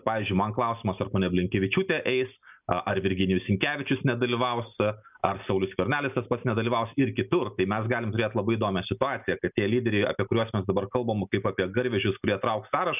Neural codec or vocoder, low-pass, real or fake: none; 3.6 kHz; real